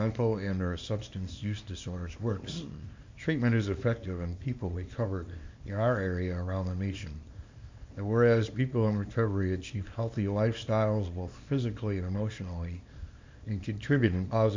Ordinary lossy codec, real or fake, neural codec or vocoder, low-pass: MP3, 64 kbps; fake; codec, 24 kHz, 0.9 kbps, WavTokenizer, small release; 7.2 kHz